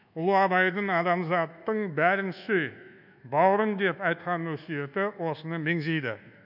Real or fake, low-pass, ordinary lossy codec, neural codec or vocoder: fake; 5.4 kHz; none; codec, 24 kHz, 1.2 kbps, DualCodec